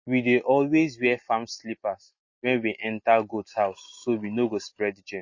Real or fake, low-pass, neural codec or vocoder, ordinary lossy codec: real; 7.2 kHz; none; MP3, 32 kbps